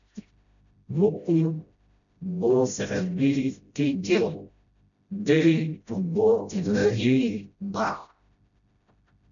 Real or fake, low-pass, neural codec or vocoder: fake; 7.2 kHz; codec, 16 kHz, 0.5 kbps, FreqCodec, smaller model